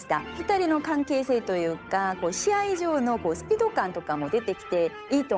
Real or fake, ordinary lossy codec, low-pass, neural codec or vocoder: fake; none; none; codec, 16 kHz, 8 kbps, FunCodec, trained on Chinese and English, 25 frames a second